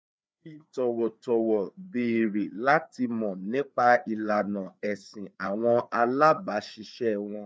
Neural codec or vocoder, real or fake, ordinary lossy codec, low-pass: codec, 16 kHz, 4 kbps, FreqCodec, larger model; fake; none; none